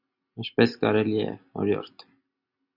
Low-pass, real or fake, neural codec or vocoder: 5.4 kHz; real; none